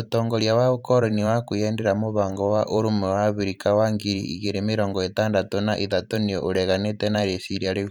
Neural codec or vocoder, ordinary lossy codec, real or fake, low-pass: vocoder, 44.1 kHz, 128 mel bands every 512 samples, BigVGAN v2; none; fake; 19.8 kHz